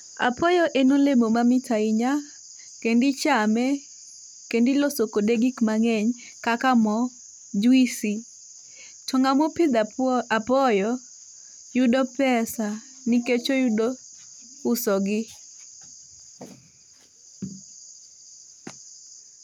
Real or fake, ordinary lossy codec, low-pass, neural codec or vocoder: real; none; 19.8 kHz; none